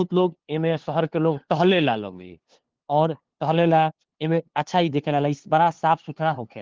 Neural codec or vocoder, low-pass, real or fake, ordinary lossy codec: codec, 16 kHz, 2 kbps, FunCodec, trained on LibriTTS, 25 frames a second; 7.2 kHz; fake; Opus, 16 kbps